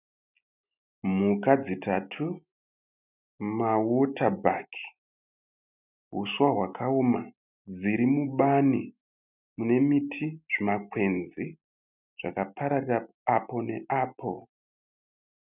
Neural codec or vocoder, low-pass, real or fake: none; 3.6 kHz; real